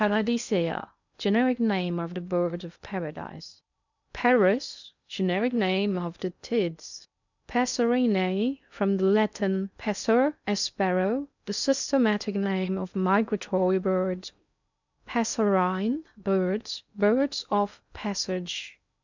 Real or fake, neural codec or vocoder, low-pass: fake; codec, 16 kHz in and 24 kHz out, 0.6 kbps, FocalCodec, streaming, 2048 codes; 7.2 kHz